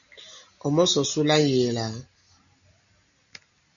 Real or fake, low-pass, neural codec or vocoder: real; 7.2 kHz; none